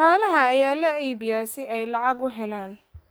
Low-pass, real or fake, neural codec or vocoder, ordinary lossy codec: none; fake; codec, 44.1 kHz, 2.6 kbps, SNAC; none